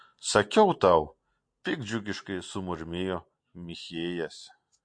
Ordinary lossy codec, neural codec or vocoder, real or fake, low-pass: MP3, 48 kbps; none; real; 9.9 kHz